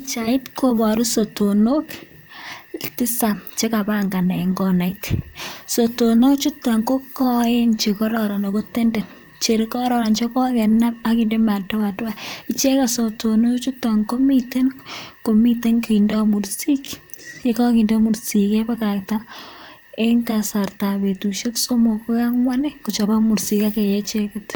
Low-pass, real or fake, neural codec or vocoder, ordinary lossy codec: none; fake; vocoder, 44.1 kHz, 128 mel bands, Pupu-Vocoder; none